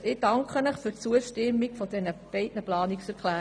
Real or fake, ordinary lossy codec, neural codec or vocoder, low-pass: real; none; none; 9.9 kHz